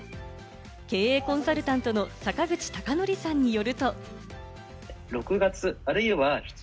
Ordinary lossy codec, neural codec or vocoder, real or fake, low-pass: none; none; real; none